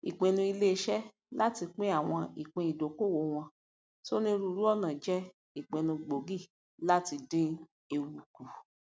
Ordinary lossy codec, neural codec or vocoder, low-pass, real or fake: none; none; none; real